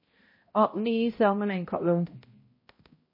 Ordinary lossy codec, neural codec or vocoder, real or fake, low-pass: MP3, 24 kbps; codec, 16 kHz, 0.5 kbps, X-Codec, HuBERT features, trained on balanced general audio; fake; 5.4 kHz